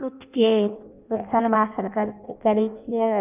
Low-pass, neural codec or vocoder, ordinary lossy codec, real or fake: 3.6 kHz; codec, 16 kHz in and 24 kHz out, 0.6 kbps, FireRedTTS-2 codec; none; fake